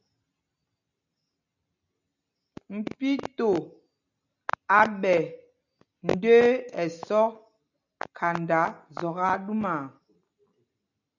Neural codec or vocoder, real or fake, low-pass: none; real; 7.2 kHz